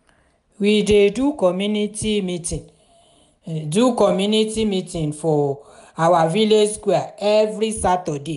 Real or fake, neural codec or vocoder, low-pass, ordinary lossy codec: real; none; 10.8 kHz; none